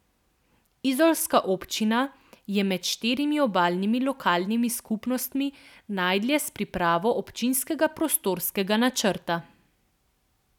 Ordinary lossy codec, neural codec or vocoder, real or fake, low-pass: none; none; real; 19.8 kHz